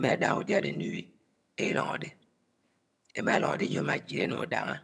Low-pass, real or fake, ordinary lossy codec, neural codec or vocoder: none; fake; none; vocoder, 22.05 kHz, 80 mel bands, HiFi-GAN